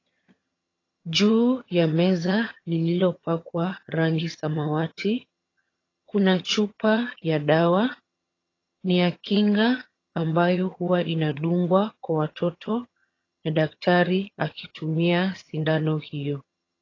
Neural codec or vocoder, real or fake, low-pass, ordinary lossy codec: vocoder, 22.05 kHz, 80 mel bands, HiFi-GAN; fake; 7.2 kHz; AAC, 32 kbps